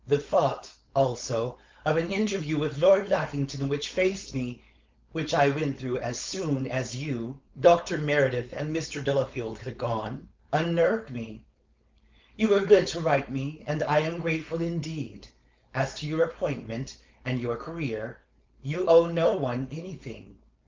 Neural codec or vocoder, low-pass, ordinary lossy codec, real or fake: codec, 16 kHz, 4.8 kbps, FACodec; 7.2 kHz; Opus, 24 kbps; fake